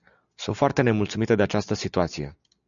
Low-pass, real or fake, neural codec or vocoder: 7.2 kHz; real; none